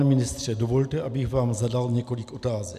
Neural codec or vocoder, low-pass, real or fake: none; 14.4 kHz; real